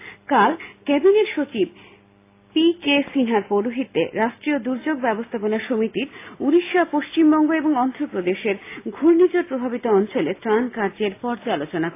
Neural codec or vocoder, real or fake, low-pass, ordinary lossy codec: none; real; 3.6 kHz; AAC, 24 kbps